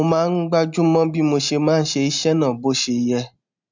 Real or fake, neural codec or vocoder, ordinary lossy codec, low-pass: real; none; MP3, 64 kbps; 7.2 kHz